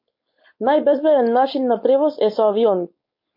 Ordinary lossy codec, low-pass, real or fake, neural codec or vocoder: MP3, 32 kbps; 5.4 kHz; fake; codec, 16 kHz, 4.8 kbps, FACodec